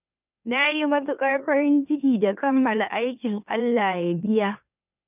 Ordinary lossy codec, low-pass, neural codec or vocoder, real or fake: none; 3.6 kHz; autoencoder, 44.1 kHz, a latent of 192 numbers a frame, MeloTTS; fake